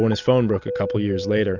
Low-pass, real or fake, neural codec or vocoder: 7.2 kHz; real; none